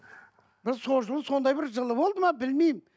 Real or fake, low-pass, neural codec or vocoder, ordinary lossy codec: real; none; none; none